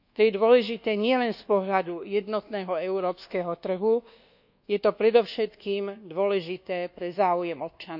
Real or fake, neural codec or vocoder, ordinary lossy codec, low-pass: fake; codec, 24 kHz, 1.2 kbps, DualCodec; none; 5.4 kHz